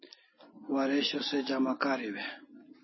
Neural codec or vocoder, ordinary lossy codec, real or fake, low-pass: none; MP3, 24 kbps; real; 7.2 kHz